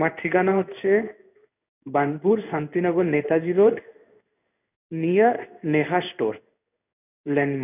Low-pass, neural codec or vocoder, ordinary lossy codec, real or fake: 3.6 kHz; codec, 16 kHz in and 24 kHz out, 1 kbps, XY-Tokenizer; none; fake